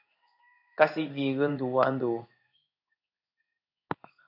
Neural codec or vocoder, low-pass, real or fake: codec, 16 kHz in and 24 kHz out, 1 kbps, XY-Tokenizer; 5.4 kHz; fake